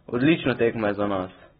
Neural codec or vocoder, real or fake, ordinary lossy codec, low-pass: none; real; AAC, 16 kbps; 19.8 kHz